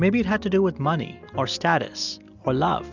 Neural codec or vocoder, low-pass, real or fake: none; 7.2 kHz; real